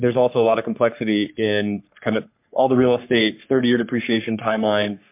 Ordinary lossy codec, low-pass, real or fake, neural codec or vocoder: MP3, 32 kbps; 3.6 kHz; fake; codec, 44.1 kHz, 3.4 kbps, Pupu-Codec